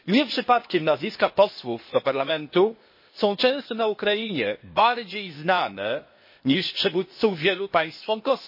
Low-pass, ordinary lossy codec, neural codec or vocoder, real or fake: 5.4 kHz; MP3, 24 kbps; codec, 16 kHz, 0.8 kbps, ZipCodec; fake